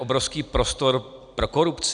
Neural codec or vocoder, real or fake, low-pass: none; real; 9.9 kHz